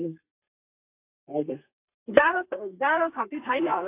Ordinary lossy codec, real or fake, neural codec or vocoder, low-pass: AAC, 24 kbps; fake; codec, 32 kHz, 1.9 kbps, SNAC; 3.6 kHz